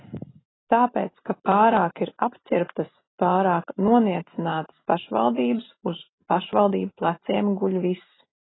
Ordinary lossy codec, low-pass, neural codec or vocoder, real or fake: AAC, 16 kbps; 7.2 kHz; none; real